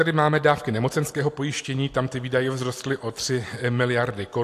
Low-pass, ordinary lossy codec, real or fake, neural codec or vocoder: 14.4 kHz; AAC, 64 kbps; real; none